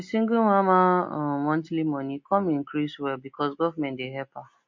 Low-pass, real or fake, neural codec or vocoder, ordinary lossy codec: 7.2 kHz; real; none; MP3, 48 kbps